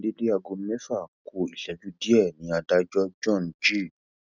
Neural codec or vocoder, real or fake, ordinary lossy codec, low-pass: none; real; none; 7.2 kHz